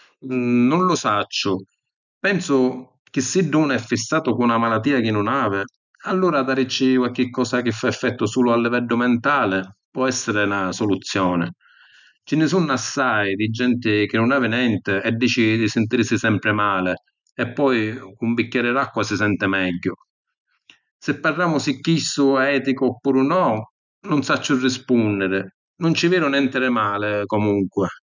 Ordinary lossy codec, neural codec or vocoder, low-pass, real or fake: none; none; 7.2 kHz; real